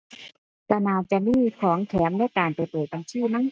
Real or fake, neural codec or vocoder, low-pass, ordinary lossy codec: real; none; none; none